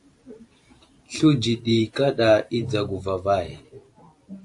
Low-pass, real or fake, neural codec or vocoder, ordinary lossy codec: 10.8 kHz; fake; vocoder, 44.1 kHz, 128 mel bands every 512 samples, BigVGAN v2; AAC, 64 kbps